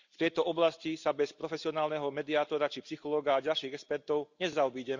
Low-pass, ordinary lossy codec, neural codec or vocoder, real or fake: 7.2 kHz; Opus, 64 kbps; none; real